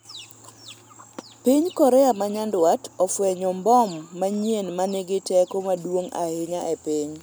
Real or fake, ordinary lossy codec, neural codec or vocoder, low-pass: real; none; none; none